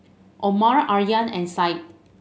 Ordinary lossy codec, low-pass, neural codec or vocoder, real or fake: none; none; none; real